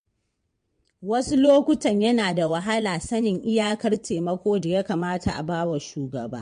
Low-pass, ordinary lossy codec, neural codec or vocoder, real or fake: 9.9 kHz; MP3, 48 kbps; vocoder, 22.05 kHz, 80 mel bands, WaveNeXt; fake